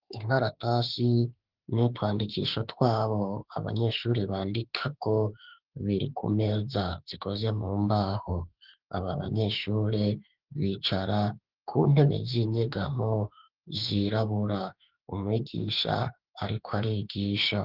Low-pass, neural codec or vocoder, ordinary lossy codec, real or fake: 5.4 kHz; autoencoder, 48 kHz, 32 numbers a frame, DAC-VAE, trained on Japanese speech; Opus, 16 kbps; fake